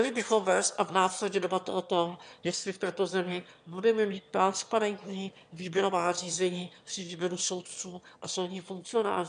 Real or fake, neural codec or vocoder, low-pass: fake; autoencoder, 22.05 kHz, a latent of 192 numbers a frame, VITS, trained on one speaker; 9.9 kHz